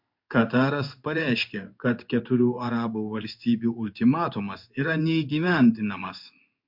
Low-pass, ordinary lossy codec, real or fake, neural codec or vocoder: 5.4 kHz; MP3, 48 kbps; fake; codec, 16 kHz in and 24 kHz out, 1 kbps, XY-Tokenizer